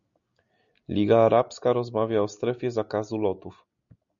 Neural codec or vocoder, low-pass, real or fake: none; 7.2 kHz; real